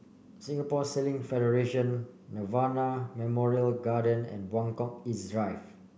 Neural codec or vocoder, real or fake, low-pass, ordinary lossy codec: none; real; none; none